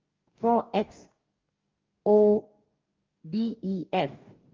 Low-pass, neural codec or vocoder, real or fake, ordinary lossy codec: 7.2 kHz; codec, 44.1 kHz, 2.6 kbps, DAC; fake; Opus, 24 kbps